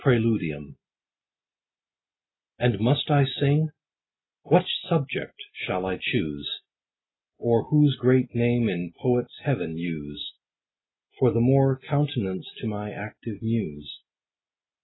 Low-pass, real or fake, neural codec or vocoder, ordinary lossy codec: 7.2 kHz; real; none; AAC, 16 kbps